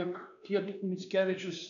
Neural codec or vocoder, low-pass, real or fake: codec, 16 kHz, 2 kbps, X-Codec, WavLM features, trained on Multilingual LibriSpeech; 7.2 kHz; fake